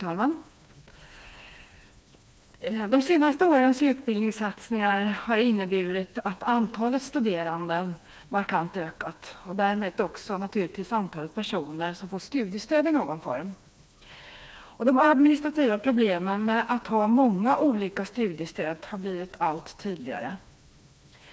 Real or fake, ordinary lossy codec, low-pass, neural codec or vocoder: fake; none; none; codec, 16 kHz, 2 kbps, FreqCodec, smaller model